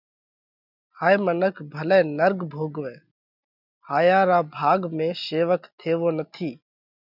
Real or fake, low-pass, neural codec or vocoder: real; 5.4 kHz; none